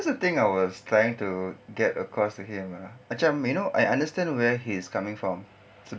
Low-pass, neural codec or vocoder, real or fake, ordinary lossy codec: none; none; real; none